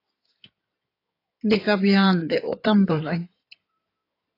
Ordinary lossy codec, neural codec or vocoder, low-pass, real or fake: AAC, 24 kbps; codec, 16 kHz in and 24 kHz out, 2.2 kbps, FireRedTTS-2 codec; 5.4 kHz; fake